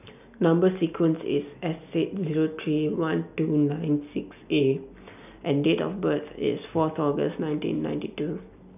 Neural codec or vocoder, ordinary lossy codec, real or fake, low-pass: none; none; real; 3.6 kHz